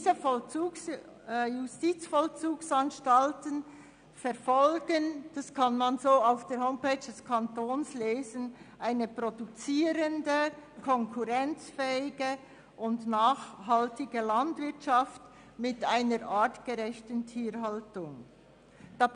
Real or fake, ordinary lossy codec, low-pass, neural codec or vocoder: real; none; 9.9 kHz; none